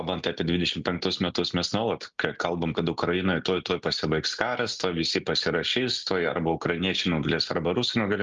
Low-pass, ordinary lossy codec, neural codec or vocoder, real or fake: 7.2 kHz; Opus, 32 kbps; none; real